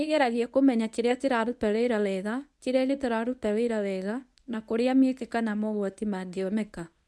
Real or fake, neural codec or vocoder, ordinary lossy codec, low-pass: fake; codec, 24 kHz, 0.9 kbps, WavTokenizer, medium speech release version 1; none; none